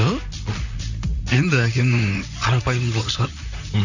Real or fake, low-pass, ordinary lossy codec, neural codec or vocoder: fake; 7.2 kHz; none; vocoder, 44.1 kHz, 128 mel bands, Pupu-Vocoder